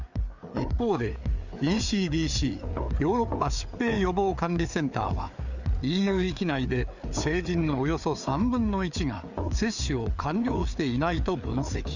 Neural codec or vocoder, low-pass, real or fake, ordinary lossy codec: codec, 16 kHz, 4 kbps, FreqCodec, larger model; 7.2 kHz; fake; none